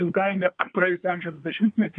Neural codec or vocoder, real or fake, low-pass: codec, 24 kHz, 1 kbps, SNAC; fake; 9.9 kHz